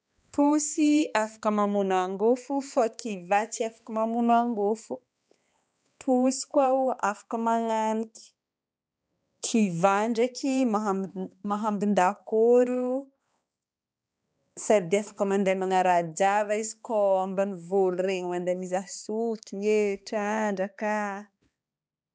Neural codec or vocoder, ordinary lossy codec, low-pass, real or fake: codec, 16 kHz, 2 kbps, X-Codec, HuBERT features, trained on balanced general audio; none; none; fake